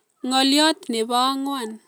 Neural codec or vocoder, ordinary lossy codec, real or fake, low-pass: none; none; real; none